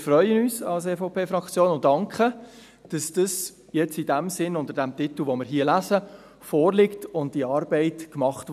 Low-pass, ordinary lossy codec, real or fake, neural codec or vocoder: 14.4 kHz; none; real; none